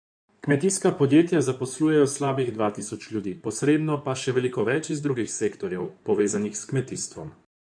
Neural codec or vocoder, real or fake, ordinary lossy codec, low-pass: codec, 16 kHz in and 24 kHz out, 2.2 kbps, FireRedTTS-2 codec; fake; none; 9.9 kHz